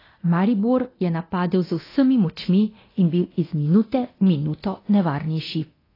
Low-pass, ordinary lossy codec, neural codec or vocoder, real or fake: 5.4 kHz; AAC, 24 kbps; codec, 24 kHz, 0.9 kbps, DualCodec; fake